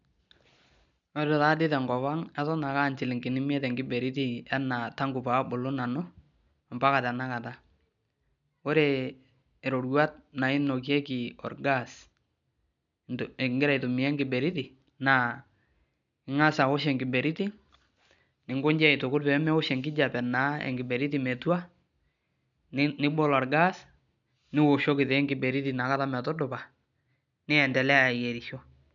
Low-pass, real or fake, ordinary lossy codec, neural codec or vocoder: 7.2 kHz; real; none; none